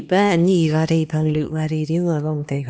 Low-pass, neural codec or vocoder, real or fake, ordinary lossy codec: none; codec, 16 kHz, 2 kbps, X-Codec, HuBERT features, trained on LibriSpeech; fake; none